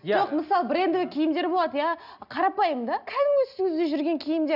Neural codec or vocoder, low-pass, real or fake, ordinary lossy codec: none; 5.4 kHz; real; none